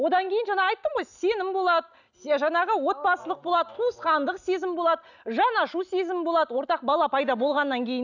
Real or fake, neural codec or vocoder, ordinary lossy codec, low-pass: real; none; none; 7.2 kHz